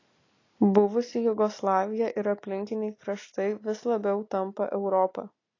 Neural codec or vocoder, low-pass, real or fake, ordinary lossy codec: none; 7.2 kHz; real; AAC, 32 kbps